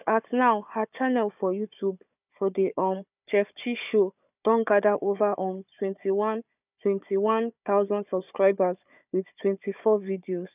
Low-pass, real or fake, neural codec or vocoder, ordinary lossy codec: 3.6 kHz; fake; codec, 16 kHz, 4 kbps, FunCodec, trained on Chinese and English, 50 frames a second; none